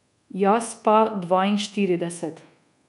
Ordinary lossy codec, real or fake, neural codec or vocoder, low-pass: none; fake; codec, 24 kHz, 1.2 kbps, DualCodec; 10.8 kHz